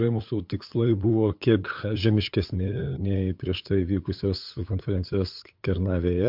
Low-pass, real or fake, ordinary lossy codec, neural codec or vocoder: 5.4 kHz; fake; MP3, 48 kbps; codec, 16 kHz, 8 kbps, FunCodec, trained on LibriTTS, 25 frames a second